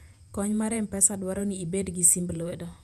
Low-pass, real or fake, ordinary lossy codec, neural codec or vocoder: 14.4 kHz; fake; none; vocoder, 48 kHz, 128 mel bands, Vocos